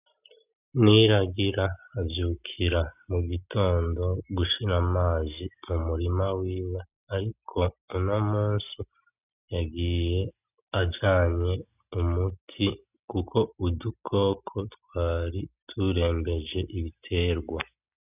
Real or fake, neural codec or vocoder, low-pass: real; none; 3.6 kHz